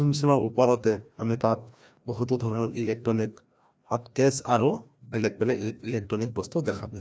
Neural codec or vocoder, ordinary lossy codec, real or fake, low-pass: codec, 16 kHz, 1 kbps, FreqCodec, larger model; none; fake; none